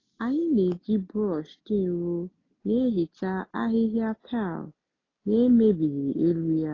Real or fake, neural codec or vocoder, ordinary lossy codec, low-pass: real; none; AAC, 32 kbps; 7.2 kHz